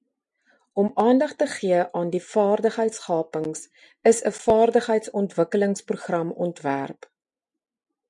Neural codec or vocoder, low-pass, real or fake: none; 10.8 kHz; real